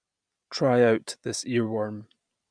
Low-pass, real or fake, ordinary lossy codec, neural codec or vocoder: 9.9 kHz; real; none; none